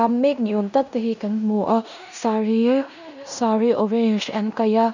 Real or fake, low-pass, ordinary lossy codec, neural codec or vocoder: fake; 7.2 kHz; none; codec, 16 kHz in and 24 kHz out, 0.9 kbps, LongCat-Audio-Codec, fine tuned four codebook decoder